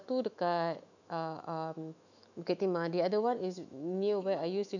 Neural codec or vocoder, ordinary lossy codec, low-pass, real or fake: autoencoder, 48 kHz, 128 numbers a frame, DAC-VAE, trained on Japanese speech; none; 7.2 kHz; fake